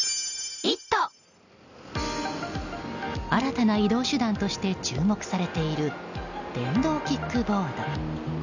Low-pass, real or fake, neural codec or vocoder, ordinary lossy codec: 7.2 kHz; real; none; none